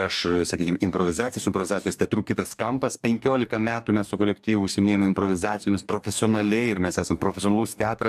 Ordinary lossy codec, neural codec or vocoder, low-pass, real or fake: AAC, 96 kbps; codec, 44.1 kHz, 2.6 kbps, DAC; 14.4 kHz; fake